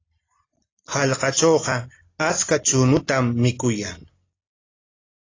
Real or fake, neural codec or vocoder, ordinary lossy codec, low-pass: real; none; AAC, 32 kbps; 7.2 kHz